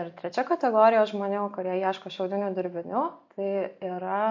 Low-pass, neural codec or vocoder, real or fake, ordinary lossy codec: 7.2 kHz; none; real; MP3, 48 kbps